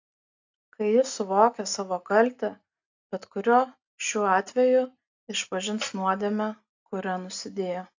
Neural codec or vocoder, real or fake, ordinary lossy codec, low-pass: none; real; AAC, 48 kbps; 7.2 kHz